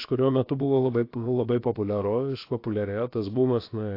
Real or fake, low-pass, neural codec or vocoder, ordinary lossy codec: fake; 5.4 kHz; codec, 16 kHz, about 1 kbps, DyCAST, with the encoder's durations; AAC, 32 kbps